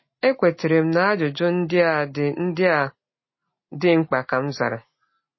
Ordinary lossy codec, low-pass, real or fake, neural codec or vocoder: MP3, 24 kbps; 7.2 kHz; real; none